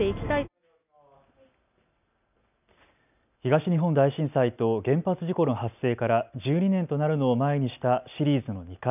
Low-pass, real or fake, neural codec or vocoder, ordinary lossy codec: 3.6 kHz; real; none; none